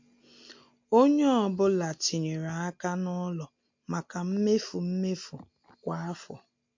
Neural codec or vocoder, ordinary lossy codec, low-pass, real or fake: none; MP3, 48 kbps; 7.2 kHz; real